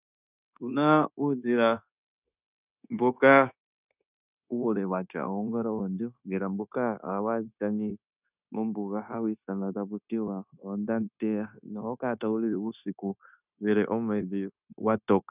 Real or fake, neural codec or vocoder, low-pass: fake; codec, 16 kHz, 0.9 kbps, LongCat-Audio-Codec; 3.6 kHz